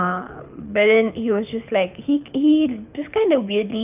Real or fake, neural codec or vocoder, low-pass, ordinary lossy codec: fake; codec, 24 kHz, 6 kbps, HILCodec; 3.6 kHz; none